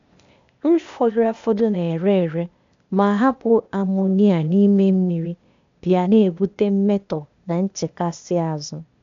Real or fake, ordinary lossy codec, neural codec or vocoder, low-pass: fake; MP3, 64 kbps; codec, 16 kHz, 0.8 kbps, ZipCodec; 7.2 kHz